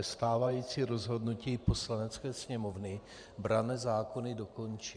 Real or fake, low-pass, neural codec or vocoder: fake; 9.9 kHz; vocoder, 44.1 kHz, 128 mel bands every 512 samples, BigVGAN v2